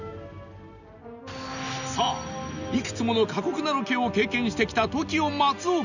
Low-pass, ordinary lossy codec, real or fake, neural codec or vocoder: 7.2 kHz; none; real; none